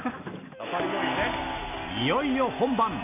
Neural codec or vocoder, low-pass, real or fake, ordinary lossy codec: none; 3.6 kHz; real; none